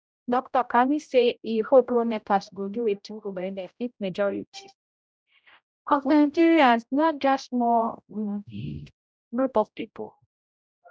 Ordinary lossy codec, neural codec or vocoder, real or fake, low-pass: none; codec, 16 kHz, 0.5 kbps, X-Codec, HuBERT features, trained on general audio; fake; none